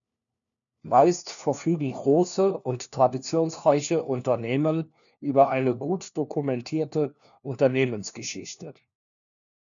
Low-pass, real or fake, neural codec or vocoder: 7.2 kHz; fake; codec, 16 kHz, 1 kbps, FunCodec, trained on LibriTTS, 50 frames a second